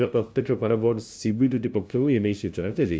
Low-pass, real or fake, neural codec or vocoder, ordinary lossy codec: none; fake; codec, 16 kHz, 0.5 kbps, FunCodec, trained on LibriTTS, 25 frames a second; none